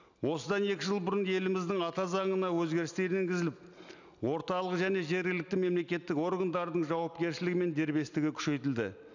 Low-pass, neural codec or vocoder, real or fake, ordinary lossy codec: 7.2 kHz; none; real; none